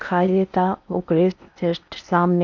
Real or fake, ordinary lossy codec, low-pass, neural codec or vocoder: fake; none; 7.2 kHz; codec, 16 kHz in and 24 kHz out, 0.8 kbps, FocalCodec, streaming, 65536 codes